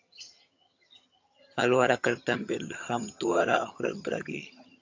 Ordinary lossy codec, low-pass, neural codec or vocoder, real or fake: AAC, 48 kbps; 7.2 kHz; vocoder, 22.05 kHz, 80 mel bands, HiFi-GAN; fake